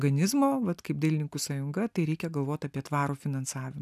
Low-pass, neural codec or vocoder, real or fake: 14.4 kHz; none; real